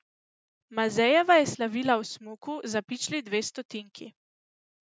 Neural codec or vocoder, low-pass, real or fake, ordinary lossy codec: none; none; real; none